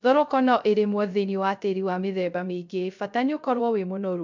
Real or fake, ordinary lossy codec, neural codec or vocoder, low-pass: fake; MP3, 64 kbps; codec, 16 kHz, 0.3 kbps, FocalCodec; 7.2 kHz